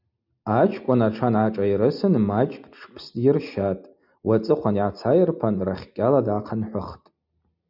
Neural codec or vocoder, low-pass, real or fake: none; 5.4 kHz; real